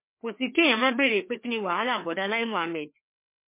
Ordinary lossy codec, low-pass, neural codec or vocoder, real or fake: MP3, 24 kbps; 3.6 kHz; codec, 16 kHz, 2 kbps, FreqCodec, larger model; fake